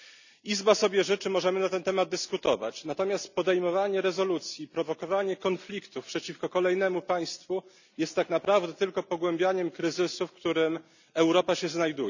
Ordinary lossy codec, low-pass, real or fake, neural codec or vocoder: none; 7.2 kHz; real; none